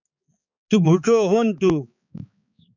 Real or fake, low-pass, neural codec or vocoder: fake; 7.2 kHz; codec, 16 kHz, 4 kbps, X-Codec, HuBERT features, trained on balanced general audio